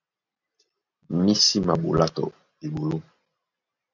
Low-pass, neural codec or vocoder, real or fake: 7.2 kHz; none; real